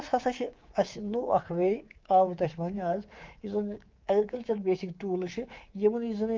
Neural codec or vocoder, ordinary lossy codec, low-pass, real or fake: codec, 24 kHz, 3.1 kbps, DualCodec; Opus, 32 kbps; 7.2 kHz; fake